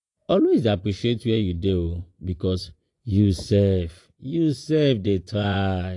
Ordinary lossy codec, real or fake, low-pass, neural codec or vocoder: AAC, 48 kbps; fake; 10.8 kHz; vocoder, 24 kHz, 100 mel bands, Vocos